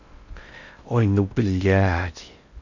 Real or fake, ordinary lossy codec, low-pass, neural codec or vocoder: fake; none; 7.2 kHz; codec, 16 kHz in and 24 kHz out, 0.6 kbps, FocalCodec, streaming, 4096 codes